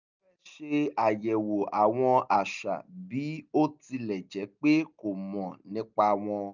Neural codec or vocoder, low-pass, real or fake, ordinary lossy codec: none; 7.2 kHz; real; none